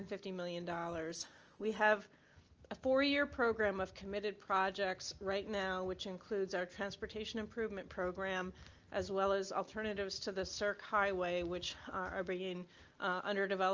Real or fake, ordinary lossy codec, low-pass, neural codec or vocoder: real; Opus, 24 kbps; 7.2 kHz; none